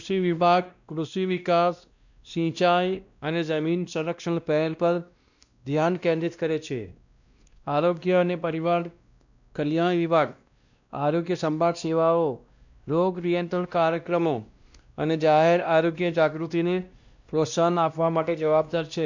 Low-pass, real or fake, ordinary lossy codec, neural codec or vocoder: 7.2 kHz; fake; none; codec, 16 kHz, 1 kbps, X-Codec, WavLM features, trained on Multilingual LibriSpeech